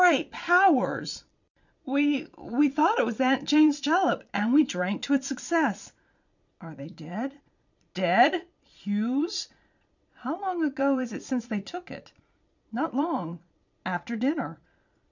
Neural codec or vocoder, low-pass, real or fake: vocoder, 22.05 kHz, 80 mel bands, Vocos; 7.2 kHz; fake